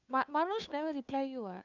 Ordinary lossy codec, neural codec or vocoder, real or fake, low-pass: none; codec, 16 kHz, 2 kbps, FunCodec, trained on Chinese and English, 25 frames a second; fake; 7.2 kHz